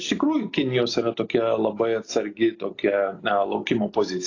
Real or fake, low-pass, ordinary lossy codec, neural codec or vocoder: real; 7.2 kHz; AAC, 32 kbps; none